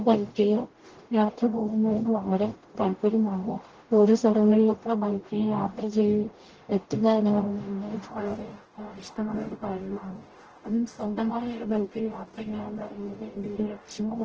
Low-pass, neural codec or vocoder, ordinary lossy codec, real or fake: 7.2 kHz; codec, 44.1 kHz, 0.9 kbps, DAC; Opus, 16 kbps; fake